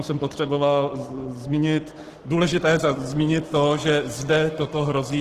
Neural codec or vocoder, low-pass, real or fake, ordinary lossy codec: codec, 44.1 kHz, 7.8 kbps, Pupu-Codec; 14.4 kHz; fake; Opus, 16 kbps